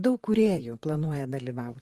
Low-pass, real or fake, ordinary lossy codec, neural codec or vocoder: 14.4 kHz; fake; Opus, 24 kbps; vocoder, 44.1 kHz, 128 mel bands, Pupu-Vocoder